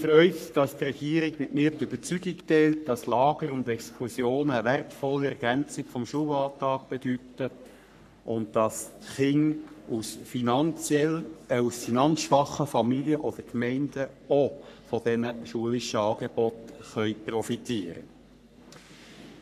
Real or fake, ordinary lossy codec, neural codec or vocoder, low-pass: fake; none; codec, 44.1 kHz, 3.4 kbps, Pupu-Codec; 14.4 kHz